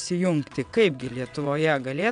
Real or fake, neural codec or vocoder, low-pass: fake; vocoder, 22.05 kHz, 80 mel bands, WaveNeXt; 9.9 kHz